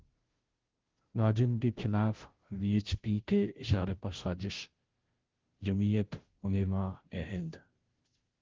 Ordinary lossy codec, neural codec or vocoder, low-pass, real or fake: Opus, 16 kbps; codec, 16 kHz, 0.5 kbps, FunCodec, trained on Chinese and English, 25 frames a second; 7.2 kHz; fake